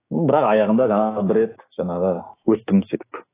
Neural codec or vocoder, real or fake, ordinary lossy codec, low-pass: codec, 16 kHz, 6 kbps, DAC; fake; AAC, 16 kbps; 3.6 kHz